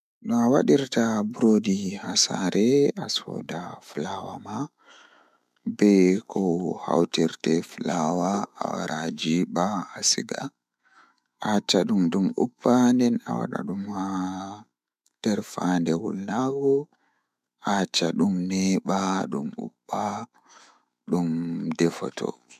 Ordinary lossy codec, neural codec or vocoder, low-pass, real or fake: MP3, 96 kbps; autoencoder, 48 kHz, 128 numbers a frame, DAC-VAE, trained on Japanese speech; 14.4 kHz; fake